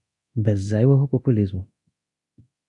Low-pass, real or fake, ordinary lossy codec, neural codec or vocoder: 10.8 kHz; fake; AAC, 64 kbps; codec, 24 kHz, 0.9 kbps, DualCodec